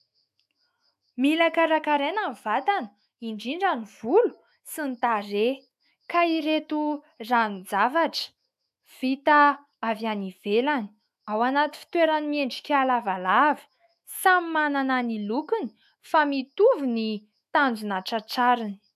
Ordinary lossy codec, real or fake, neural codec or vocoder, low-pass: AAC, 96 kbps; fake; autoencoder, 48 kHz, 128 numbers a frame, DAC-VAE, trained on Japanese speech; 14.4 kHz